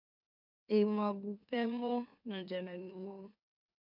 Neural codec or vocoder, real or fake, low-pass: autoencoder, 44.1 kHz, a latent of 192 numbers a frame, MeloTTS; fake; 5.4 kHz